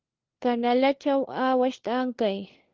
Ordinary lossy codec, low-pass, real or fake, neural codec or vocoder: Opus, 16 kbps; 7.2 kHz; fake; codec, 16 kHz, 4 kbps, FunCodec, trained on LibriTTS, 50 frames a second